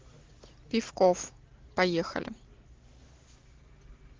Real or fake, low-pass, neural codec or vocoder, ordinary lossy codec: real; 7.2 kHz; none; Opus, 16 kbps